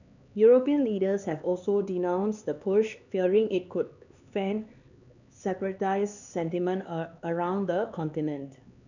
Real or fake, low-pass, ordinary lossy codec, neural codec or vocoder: fake; 7.2 kHz; none; codec, 16 kHz, 4 kbps, X-Codec, HuBERT features, trained on LibriSpeech